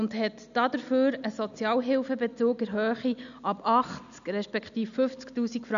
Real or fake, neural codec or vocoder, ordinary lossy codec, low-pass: real; none; none; 7.2 kHz